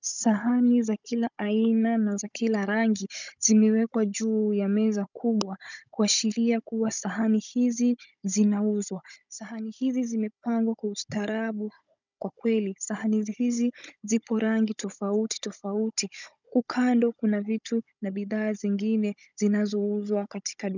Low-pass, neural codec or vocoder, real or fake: 7.2 kHz; codec, 16 kHz, 16 kbps, FunCodec, trained on Chinese and English, 50 frames a second; fake